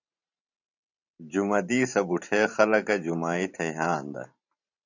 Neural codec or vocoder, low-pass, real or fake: vocoder, 44.1 kHz, 128 mel bands every 512 samples, BigVGAN v2; 7.2 kHz; fake